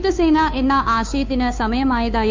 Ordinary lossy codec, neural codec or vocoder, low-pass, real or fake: MP3, 64 kbps; codec, 16 kHz, 2 kbps, FunCodec, trained on Chinese and English, 25 frames a second; 7.2 kHz; fake